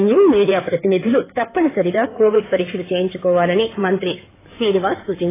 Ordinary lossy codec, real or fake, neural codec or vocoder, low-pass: AAC, 16 kbps; fake; codec, 16 kHz in and 24 kHz out, 2.2 kbps, FireRedTTS-2 codec; 3.6 kHz